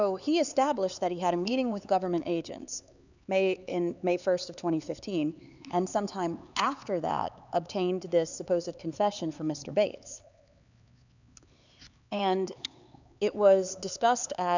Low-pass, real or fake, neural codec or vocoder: 7.2 kHz; fake; codec, 16 kHz, 4 kbps, X-Codec, HuBERT features, trained on LibriSpeech